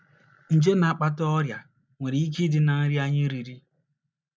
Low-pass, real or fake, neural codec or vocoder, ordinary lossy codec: none; real; none; none